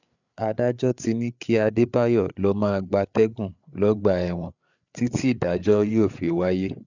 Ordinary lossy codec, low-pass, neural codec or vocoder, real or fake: none; 7.2 kHz; codec, 16 kHz, 8 kbps, FreqCodec, larger model; fake